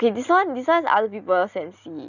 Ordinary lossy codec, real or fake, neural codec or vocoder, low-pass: none; real; none; 7.2 kHz